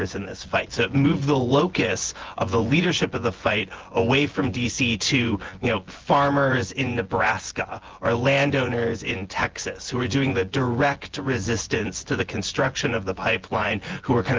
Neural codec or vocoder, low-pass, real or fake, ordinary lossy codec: vocoder, 24 kHz, 100 mel bands, Vocos; 7.2 kHz; fake; Opus, 16 kbps